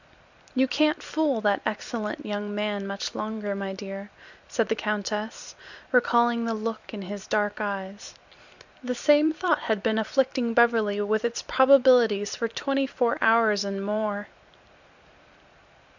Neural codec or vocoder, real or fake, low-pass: vocoder, 44.1 kHz, 128 mel bands every 256 samples, BigVGAN v2; fake; 7.2 kHz